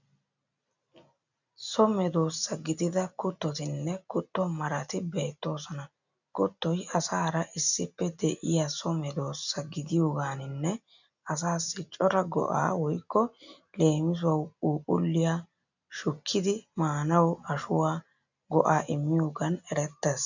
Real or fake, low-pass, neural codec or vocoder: real; 7.2 kHz; none